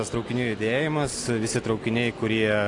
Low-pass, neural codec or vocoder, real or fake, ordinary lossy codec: 10.8 kHz; none; real; AAC, 48 kbps